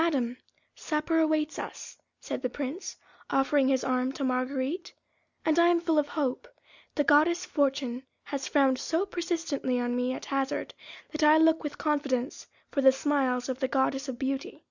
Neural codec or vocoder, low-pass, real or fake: none; 7.2 kHz; real